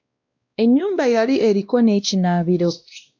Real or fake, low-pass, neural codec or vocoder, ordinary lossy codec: fake; 7.2 kHz; codec, 16 kHz, 1 kbps, X-Codec, WavLM features, trained on Multilingual LibriSpeech; MP3, 64 kbps